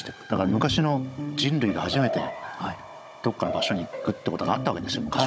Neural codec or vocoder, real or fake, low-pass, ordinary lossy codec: codec, 16 kHz, 16 kbps, FunCodec, trained on Chinese and English, 50 frames a second; fake; none; none